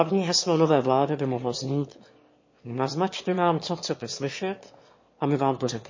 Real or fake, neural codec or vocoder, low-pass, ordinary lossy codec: fake; autoencoder, 22.05 kHz, a latent of 192 numbers a frame, VITS, trained on one speaker; 7.2 kHz; MP3, 32 kbps